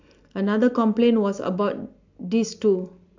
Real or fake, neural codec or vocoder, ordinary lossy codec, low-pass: real; none; AAC, 48 kbps; 7.2 kHz